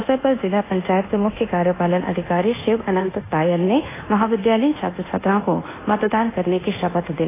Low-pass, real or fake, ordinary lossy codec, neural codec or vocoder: 3.6 kHz; fake; AAC, 16 kbps; codec, 24 kHz, 1.2 kbps, DualCodec